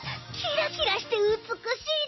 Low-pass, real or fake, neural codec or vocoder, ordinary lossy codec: 7.2 kHz; real; none; MP3, 24 kbps